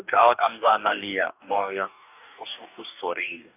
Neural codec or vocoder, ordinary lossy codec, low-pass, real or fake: codec, 44.1 kHz, 2.6 kbps, DAC; none; 3.6 kHz; fake